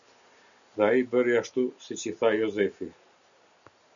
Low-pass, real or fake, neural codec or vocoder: 7.2 kHz; real; none